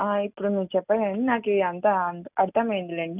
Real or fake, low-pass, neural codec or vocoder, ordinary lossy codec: real; 3.6 kHz; none; none